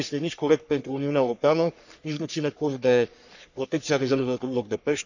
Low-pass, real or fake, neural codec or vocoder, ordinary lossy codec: 7.2 kHz; fake; codec, 44.1 kHz, 3.4 kbps, Pupu-Codec; none